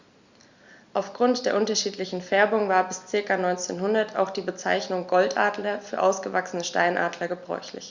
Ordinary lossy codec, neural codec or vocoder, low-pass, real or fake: Opus, 64 kbps; none; 7.2 kHz; real